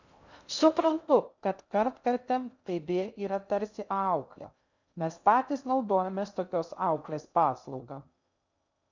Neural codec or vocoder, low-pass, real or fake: codec, 16 kHz in and 24 kHz out, 0.6 kbps, FocalCodec, streaming, 2048 codes; 7.2 kHz; fake